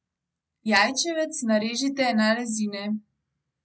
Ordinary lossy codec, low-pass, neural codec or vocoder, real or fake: none; none; none; real